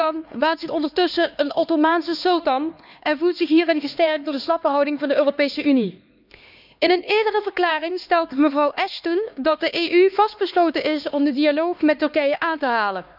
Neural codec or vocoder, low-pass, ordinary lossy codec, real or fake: codec, 16 kHz, 2 kbps, X-Codec, HuBERT features, trained on LibriSpeech; 5.4 kHz; none; fake